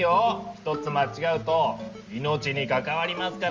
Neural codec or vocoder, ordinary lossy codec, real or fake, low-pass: none; Opus, 32 kbps; real; 7.2 kHz